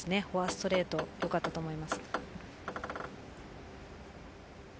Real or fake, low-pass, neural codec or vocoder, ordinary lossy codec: real; none; none; none